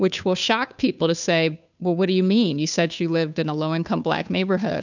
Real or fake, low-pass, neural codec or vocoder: fake; 7.2 kHz; codec, 16 kHz, 2 kbps, FunCodec, trained on Chinese and English, 25 frames a second